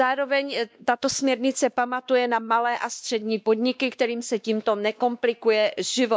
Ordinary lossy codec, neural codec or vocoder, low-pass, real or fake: none; codec, 16 kHz, 2 kbps, X-Codec, WavLM features, trained on Multilingual LibriSpeech; none; fake